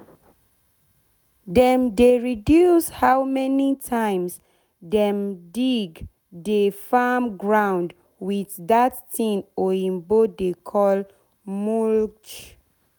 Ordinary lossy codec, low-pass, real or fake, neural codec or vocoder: none; none; real; none